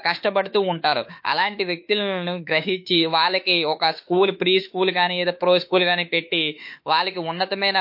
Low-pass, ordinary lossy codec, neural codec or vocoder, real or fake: 5.4 kHz; MP3, 32 kbps; autoencoder, 48 kHz, 32 numbers a frame, DAC-VAE, trained on Japanese speech; fake